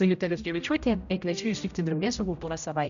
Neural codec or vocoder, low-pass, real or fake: codec, 16 kHz, 0.5 kbps, X-Codec, HuBERT features, trained on general audio; 7.2 kHz; fake